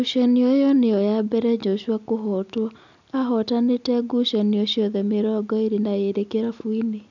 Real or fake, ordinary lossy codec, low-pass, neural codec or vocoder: real; none; 7.2 kHz; none